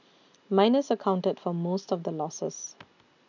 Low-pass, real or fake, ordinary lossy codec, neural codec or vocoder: 7.2 kHz; real; none; none